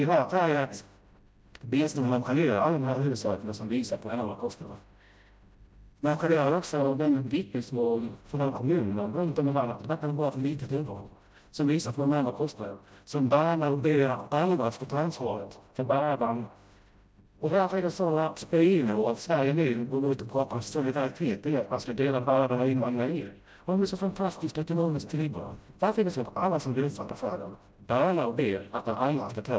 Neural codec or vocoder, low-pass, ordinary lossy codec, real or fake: codec, 16 kHz, 0.5 kbps, FreqCodec, smaller model; none; none; fake